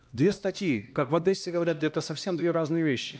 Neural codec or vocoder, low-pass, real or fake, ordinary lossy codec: codec, 16 kHz, 1 kbps, X-Codec, HuBERT features, trained on LibriSpeech; none; fake; none